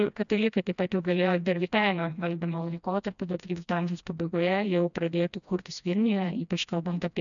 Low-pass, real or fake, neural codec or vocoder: 7.2 kHz; fake; codec, 16 kHz, 1 kbps, FreqCodec, smaller model